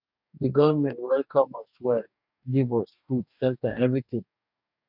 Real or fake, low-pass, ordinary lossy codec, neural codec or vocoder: fake; 5.4 kHz; none; codec, 44.1 kHz, 2.6 kbps, DAC